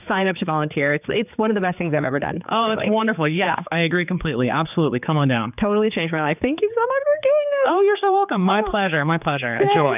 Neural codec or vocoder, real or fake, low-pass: codec, 16 kHz, 4 kbps, X-Codec, HuBERT features, trained on general audio; fake; 3.6 kHz